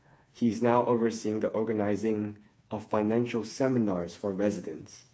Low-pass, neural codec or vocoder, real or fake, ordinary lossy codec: none; codec, 16 kHz, 4 kbps, FreqCodec, smaller model; fake; none